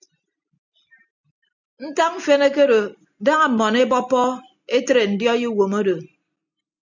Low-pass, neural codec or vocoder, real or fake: 7.2 kHz; none; real